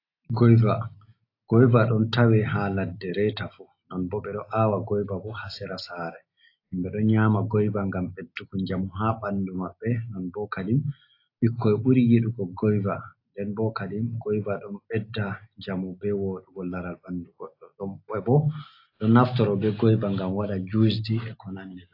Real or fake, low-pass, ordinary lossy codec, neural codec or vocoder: real; 5.4 kHz; AAC, 32 kbps; none